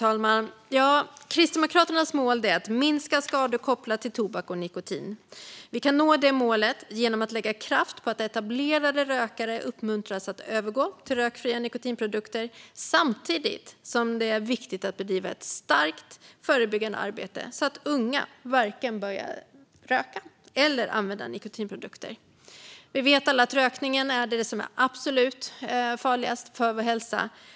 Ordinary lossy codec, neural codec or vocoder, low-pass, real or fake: none; none; none; real